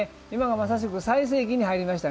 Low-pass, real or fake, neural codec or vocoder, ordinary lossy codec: none; real; none; none